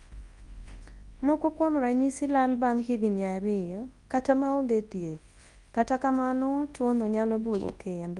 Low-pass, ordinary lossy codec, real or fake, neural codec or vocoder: 10.8 kHz; Opus, 32 kbps; fake; codec, 24 kHz, 0.9 kbps, WavTokenizer, large speech release